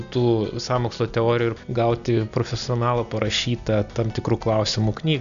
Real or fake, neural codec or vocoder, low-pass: real; none; 7.2 kHz